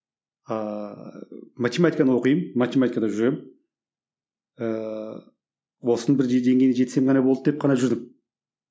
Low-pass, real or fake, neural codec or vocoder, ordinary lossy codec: none; real; none; none